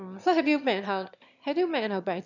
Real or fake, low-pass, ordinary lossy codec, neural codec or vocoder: fake; 7.2 kHz; none; autoencoder, 22.05 kHz, a latent of 192 numbers a frame, VITS, trained on one speaker